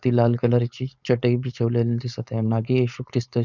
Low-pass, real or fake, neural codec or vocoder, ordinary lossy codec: 7.2 kHz; fake; codec, 16 kHz, 4.8 kbps, FACodec; none